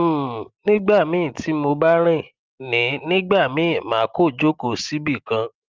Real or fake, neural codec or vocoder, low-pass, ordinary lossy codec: real; none; 7.2 kHz; Opus, 32 kbps